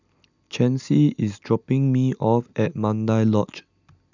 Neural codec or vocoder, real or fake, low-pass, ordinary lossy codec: none; real; 7.2 kHz; none